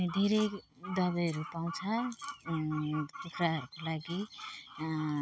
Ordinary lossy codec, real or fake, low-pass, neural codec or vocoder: none; real; none; none